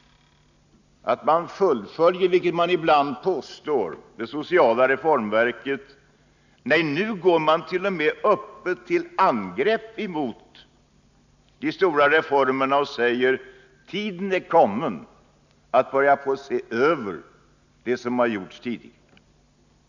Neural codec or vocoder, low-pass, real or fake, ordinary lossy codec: none; 7.2 kHz; real; none